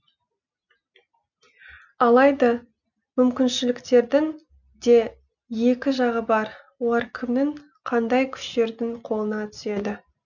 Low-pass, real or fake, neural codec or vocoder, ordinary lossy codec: 7.2 kHz; real; none; none